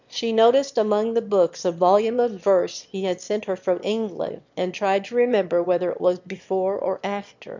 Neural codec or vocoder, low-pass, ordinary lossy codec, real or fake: autoencoder, 22.05 kHz, a latent of 192 numbers a frame, VITS, trained on one speaker; 7.2 kHz; MP3, 64 kbps; fake